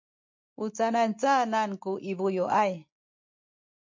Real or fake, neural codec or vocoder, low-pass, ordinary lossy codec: real; none; 7.2 kHz; MP3, 48 kbps